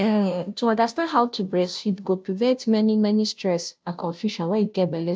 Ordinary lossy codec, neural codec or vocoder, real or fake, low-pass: none; codec, 16 kHz, 0.5 kbps, FunCodec, trained on Chinese and English, 25 frames a second; fake; none